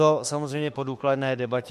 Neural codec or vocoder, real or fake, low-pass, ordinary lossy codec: autoencoder, 48 kHz, 32 numbers a frame, DAC-VAE, trained on Japanese speech; fake; 14.4 kHz; MP3, 64 kbps